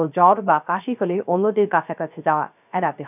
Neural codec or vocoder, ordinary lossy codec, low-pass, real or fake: codec, 16 kHz, 0.3 kbps, FocalCodec; none; 3.6 kHz; fake